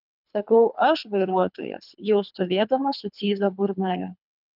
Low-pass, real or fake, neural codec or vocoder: 5.4 kHz; fake; codec, 24 kHz, 3 kbps, HILCodec